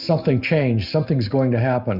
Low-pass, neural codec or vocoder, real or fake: 5.4 kHz; none; real